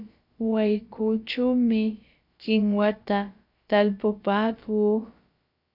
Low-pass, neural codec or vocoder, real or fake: 5.4 kHz; codec, 16 kHz, about 1 kbps, DyCAST, with the encoder's durations; fake